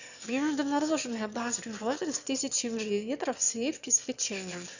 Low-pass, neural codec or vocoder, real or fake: 7.2 kHz; autoencoder, 22.05 kHz, a latent of 192 numbers a frame, VITS, trained on one speaker; fake